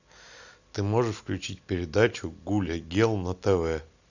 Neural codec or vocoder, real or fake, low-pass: none; real; 7.2 kHz